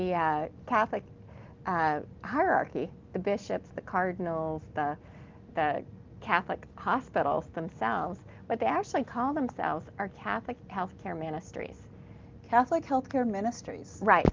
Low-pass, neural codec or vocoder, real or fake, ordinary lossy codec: 7.2 kHz; none; real; Opus, 32 kbps